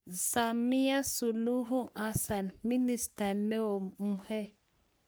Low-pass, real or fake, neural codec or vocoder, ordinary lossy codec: none; fake; codec, 44.1 kHz, 3.4 kbps, Pupu-Codec; none